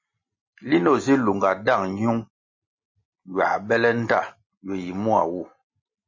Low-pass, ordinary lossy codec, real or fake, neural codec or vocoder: 7.2 kHz; MP3, 32 kbps; real; none